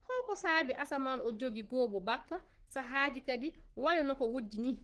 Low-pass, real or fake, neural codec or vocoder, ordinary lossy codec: 10.8 kHz; fake; codec, 44.1 kHz, 3.4 kbps, Pupu-Codec; Opus, 16 kbps